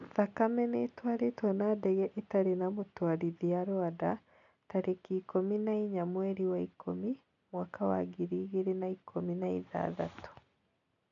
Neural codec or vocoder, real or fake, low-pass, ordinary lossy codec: none; real; 7.2 kHz; none